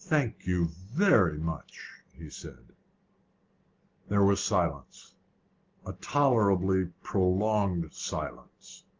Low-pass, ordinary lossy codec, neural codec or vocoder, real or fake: 7.2 kHz; Opus, 16 kbps; none; real